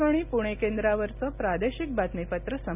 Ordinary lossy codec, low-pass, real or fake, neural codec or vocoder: none; 3.6 kHz; real; none